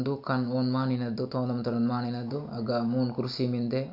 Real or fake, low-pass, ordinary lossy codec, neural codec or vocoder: real; 5.4 kHz; none; none